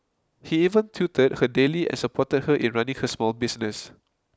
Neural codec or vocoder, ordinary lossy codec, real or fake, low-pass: none; none; real; none